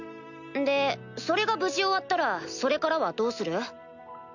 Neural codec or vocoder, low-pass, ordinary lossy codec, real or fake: none; 7.2 kHz; none; real